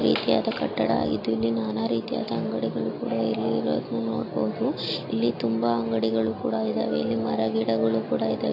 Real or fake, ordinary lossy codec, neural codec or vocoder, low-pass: real; AAC, 32 kbps; none; 5.4 kHz